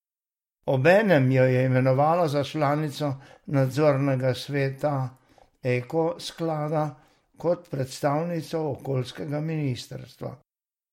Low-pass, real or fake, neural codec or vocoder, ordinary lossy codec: 19.8 kHz; real; none; MP3, 64 kbps